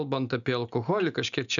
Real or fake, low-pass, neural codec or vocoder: real; 7.2 kHz; none